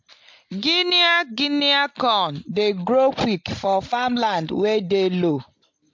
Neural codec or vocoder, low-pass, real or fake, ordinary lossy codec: none; 7.2 kHz; real; MP3, 48 kbps